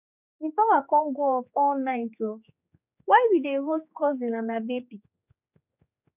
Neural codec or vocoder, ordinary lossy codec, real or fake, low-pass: codec, 16 kHz, 4 kbps, X-Codec, HuBERT features, trained on general audio; none; fake; 3.6 kHz